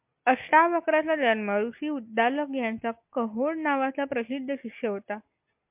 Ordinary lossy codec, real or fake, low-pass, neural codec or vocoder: MP3, 32 kbps; real; 3.6 kHz; none